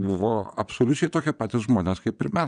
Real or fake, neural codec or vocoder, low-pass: fake; vocoder, 22.05 kHz, 80 mel bands, WaveNeXt; 9.9 kHz